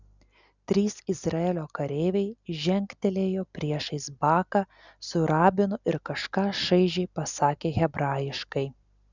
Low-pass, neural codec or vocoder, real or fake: 7.2 kHz; none; real